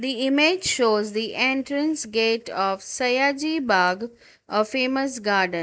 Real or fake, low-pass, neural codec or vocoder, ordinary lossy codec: real; none; none; none